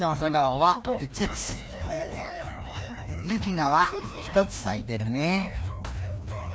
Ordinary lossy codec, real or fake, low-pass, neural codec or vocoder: none; fake; none; codec, 16 kHz, 1 kbps, FreqCodec, larger model